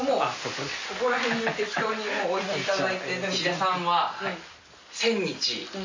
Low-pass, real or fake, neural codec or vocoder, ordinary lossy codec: 7.2 kHz; real; none; MP3, 32 kbps